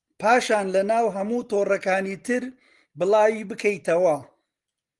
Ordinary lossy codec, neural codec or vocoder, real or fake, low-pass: Opus, 24 kbps; none; real; 10.8 kHz